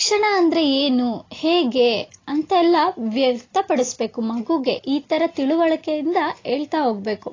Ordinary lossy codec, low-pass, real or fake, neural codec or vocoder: AAC, 32 kbps; 7.2 kHz; real; none